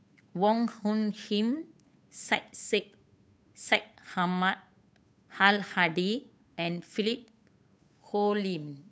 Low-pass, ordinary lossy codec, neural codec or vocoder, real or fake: none; none; codec, 16 kHz, 8 kbps, FunCodec, trained on Chinese and English, 25 frames a second; fake